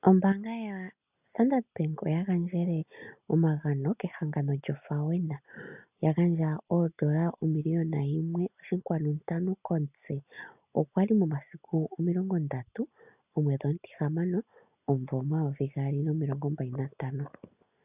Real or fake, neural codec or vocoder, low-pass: real; none; 3.6 kHz